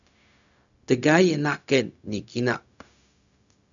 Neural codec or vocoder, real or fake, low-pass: codec, 16 kHz, 0.4 kbps, LongCat-Audio-Codec; fake; 7.2 kHz